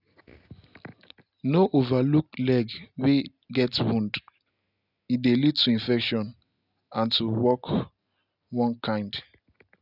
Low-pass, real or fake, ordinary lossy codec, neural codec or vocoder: 5.4 kHz; real; none; none